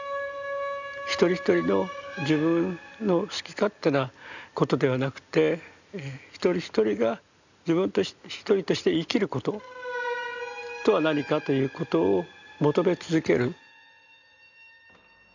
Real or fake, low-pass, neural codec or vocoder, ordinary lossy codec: real; 7.2 kHz; none; none